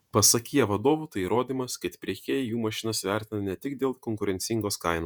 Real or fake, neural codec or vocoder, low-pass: fake; vocoder, 48 kHz, 128 mel bands, Vocos; 19.8 kHz